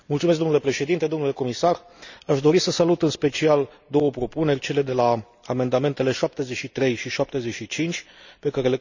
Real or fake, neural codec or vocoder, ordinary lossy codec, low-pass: real; none; none; 7.2 kHz